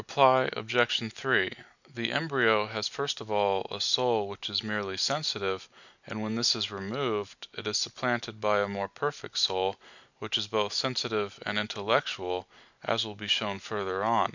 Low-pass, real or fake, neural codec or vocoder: 7.2 kHz; real; none